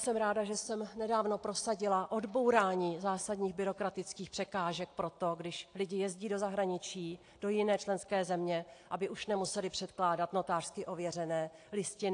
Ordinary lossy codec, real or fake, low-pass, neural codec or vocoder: AAC, 48 kbps; real; 9.9 kHz; none